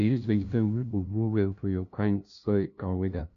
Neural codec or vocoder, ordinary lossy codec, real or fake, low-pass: codec, 16 kHz, 0.5 kbps, FunCodec, trained on LibriTTS, 25 frames a second; none; fake; 7.2 kHz